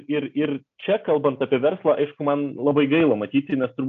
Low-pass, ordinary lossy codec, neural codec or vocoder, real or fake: 7.2 kHz; MP3, 64 kbps; none; real